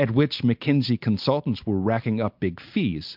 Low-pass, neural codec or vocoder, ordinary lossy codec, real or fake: 5.4 kHz; none; MP3, 48 kbps; real